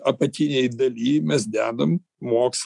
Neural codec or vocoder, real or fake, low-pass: none; real; 10.8 kHz